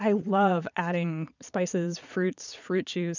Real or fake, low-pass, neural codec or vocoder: fake; 7.2 kHz; vocoder, 22.05 kHz, 80 mel bands, Vocos